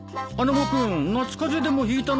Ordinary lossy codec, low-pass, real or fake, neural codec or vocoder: none; none; real; none